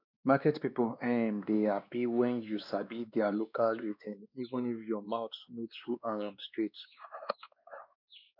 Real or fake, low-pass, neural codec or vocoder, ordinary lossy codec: fake; 5.4 kHz; codec, 16 kHz, 2 kbps, X-Codec, WavLM features, trained on Multilingual LibriSpeech; none